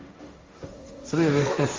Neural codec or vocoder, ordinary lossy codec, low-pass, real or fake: codec, 16 kHz, 1.1 kbps, Voila-Tokenizer; Opus, 32 kbps; 7.2 kHz; fake